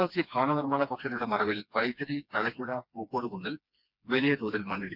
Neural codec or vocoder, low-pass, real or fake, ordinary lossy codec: codec, 16 kHz, 2 kbps, FreqCodec, smaller model; 5.4 kHz; fake; none